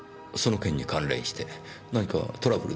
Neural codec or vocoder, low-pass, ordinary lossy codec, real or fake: none; none; none; real